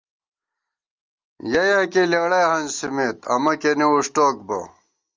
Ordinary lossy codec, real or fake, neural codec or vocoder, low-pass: Opus, 32 kbps; real; none; 7.2 kHz